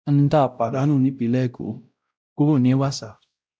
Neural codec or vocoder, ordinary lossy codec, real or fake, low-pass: codec, 16 kHz, 0.5 kbps, X-Codec, WavLM features, trained on Multilingual LibriSpeech; none; fake; none